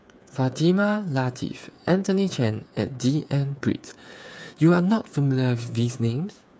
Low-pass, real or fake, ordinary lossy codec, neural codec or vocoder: none; fake; none; codec, 16 kHz, 8 kbps, FreqCodec, smaller model